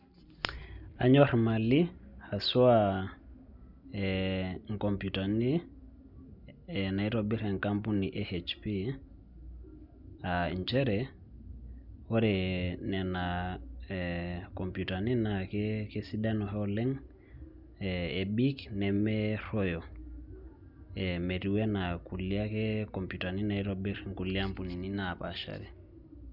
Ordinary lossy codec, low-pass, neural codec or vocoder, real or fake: none; 5.4 kHz; none; real